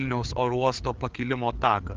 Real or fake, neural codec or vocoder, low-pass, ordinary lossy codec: fake; codec, 16 kHz, 4 kbps, FreqCodec, larger model; 7.2 kHz; Opus, 32 kbps